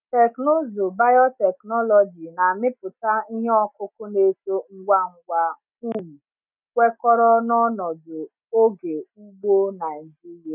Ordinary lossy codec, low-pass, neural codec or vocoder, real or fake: none; 3.6 kHz; none; real